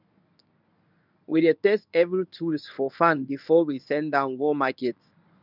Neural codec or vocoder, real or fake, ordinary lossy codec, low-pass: codec, 24 kHz, 0.9 kbps, WavTokenizer, medium speech release version 1; fake; none; 5.4 kHz